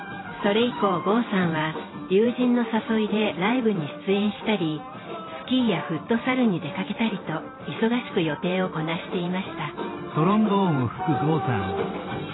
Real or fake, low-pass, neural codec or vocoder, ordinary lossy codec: fake; 7.2 kHz; vocoder, 44.1 kHz, 128 mel bands every 512 samples, BigVGAN v2; AAC, 16 kbps